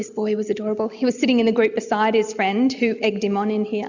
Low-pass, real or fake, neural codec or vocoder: 7.2 kHz; real; none